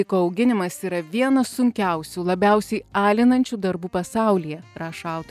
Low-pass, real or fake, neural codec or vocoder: 14.4 kHz; real; none